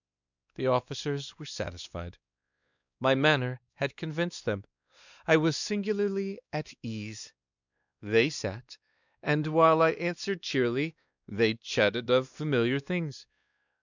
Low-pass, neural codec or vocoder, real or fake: 7.2 kHz; codec, 16 kHz, 2 kbps, X-Codec, WavLM features, trained on Multilingual LibriSpeech; fake